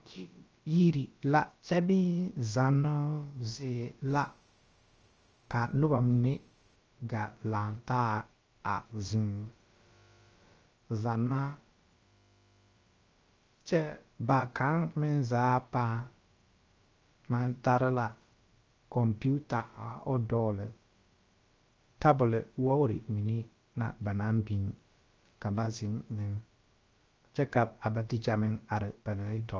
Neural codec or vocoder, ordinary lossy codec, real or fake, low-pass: codec, 16 kHz, about 1 kbps, DyCAST, with the encoder's durations; Opus, 24 kbps; fake; 7.2 kHz